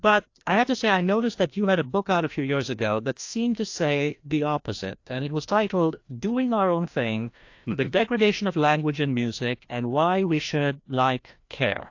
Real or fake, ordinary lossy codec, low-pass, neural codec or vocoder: fake; AAC, 48 kbps; 7.2 kHz; codec, 16 kHz, 1 kbps, FreqCodec, larger model